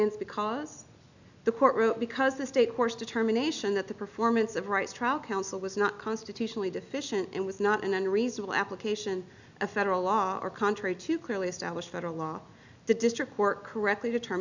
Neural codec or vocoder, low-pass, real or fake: none; 7.2 kHz; real